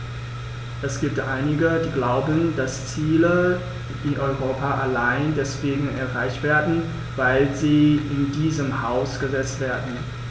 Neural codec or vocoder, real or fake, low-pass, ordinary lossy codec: none; real; none; none